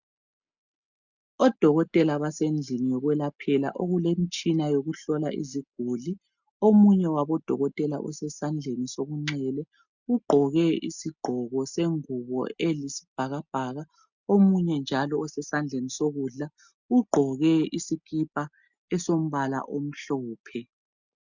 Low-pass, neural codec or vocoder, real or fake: 7.2 kHz; none; real